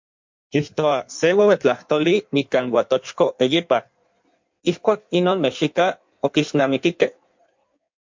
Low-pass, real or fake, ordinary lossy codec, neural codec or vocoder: 7.2 kHz; fake; MP3, 48 kbps; codec, 16 kHz in and 24 kHz out, 1.1 kbps, FireRedTTS-2 codec